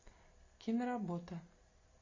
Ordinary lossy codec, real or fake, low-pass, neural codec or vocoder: MP3, 32 kbps; real; 7.2 kHz; none